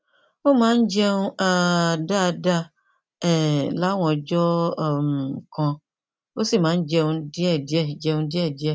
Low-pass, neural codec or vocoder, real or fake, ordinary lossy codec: none; none; real; none